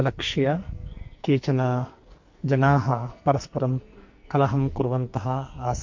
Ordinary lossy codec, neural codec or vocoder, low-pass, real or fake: MP3, 48 kbps; codec, 44.1 kHz, 2.6 kbps, SNAC; 7.2 kHz; fake